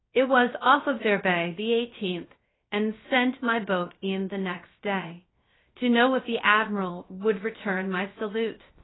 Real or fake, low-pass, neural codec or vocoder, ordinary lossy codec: fake; 7.2 kHz; codec, 16 kHz, 0.3 kbps, FocalCodec; AAC, 16 kbps